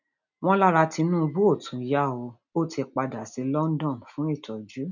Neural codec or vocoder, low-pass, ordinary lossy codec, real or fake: none; 7.2 kHz; none; real